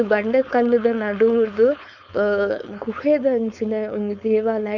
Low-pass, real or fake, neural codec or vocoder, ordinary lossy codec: 7.2 kHz; fake; codec, 16 kHz, 4.8 kbps, FACodec; none